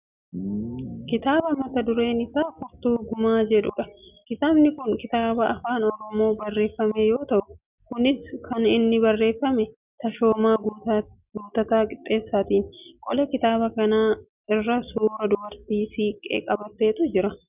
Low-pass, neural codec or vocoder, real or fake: 3.6 kHz; none; real